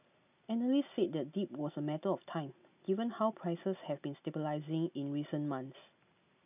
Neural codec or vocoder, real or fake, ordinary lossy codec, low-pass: none; real; none; 3.6 kHz